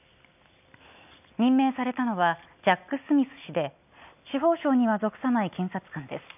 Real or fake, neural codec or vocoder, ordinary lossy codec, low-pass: real; none; none; 3.6 kHz